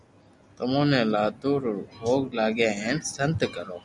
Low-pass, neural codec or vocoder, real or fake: 10.8 kHz; none; real